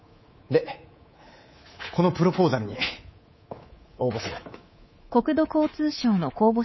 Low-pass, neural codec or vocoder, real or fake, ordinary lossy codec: 7.2 kHz; codec, 24 kHz, 3.1 kbps, DualCodec; fake; MP3, 24 kbps